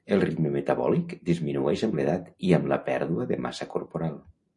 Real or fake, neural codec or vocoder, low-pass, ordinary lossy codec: real; none; 10.8 kHz; MP3, 48 kbps